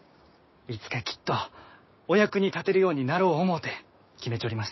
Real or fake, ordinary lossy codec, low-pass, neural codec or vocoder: fake; MP3, 24 kbps; 7.2 kHz; codec, 16 kHz, 6 kbps, DAC